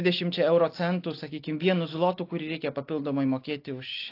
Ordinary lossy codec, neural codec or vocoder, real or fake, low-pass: AAC, 32 kbps; none; real; 5.4 kHz